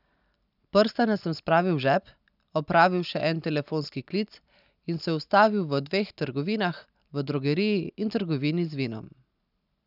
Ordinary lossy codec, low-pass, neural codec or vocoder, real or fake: none; 5.4 kHz; none; real